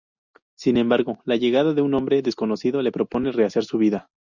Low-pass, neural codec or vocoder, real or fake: 7.2 kHz; none; real